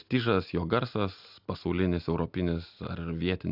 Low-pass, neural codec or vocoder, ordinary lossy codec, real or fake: 5.4 kHz; none; AAC, 48 kbps; real